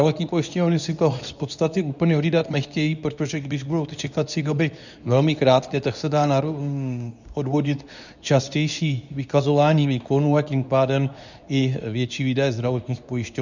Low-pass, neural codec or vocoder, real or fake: 7.2 kHz; codec, 24 kHz, 0.9 kbps, WavTokenizer, medium speech release version 2; fake